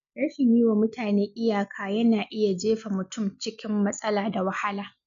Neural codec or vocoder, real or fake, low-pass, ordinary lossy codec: none; real; 7.2 kHz; none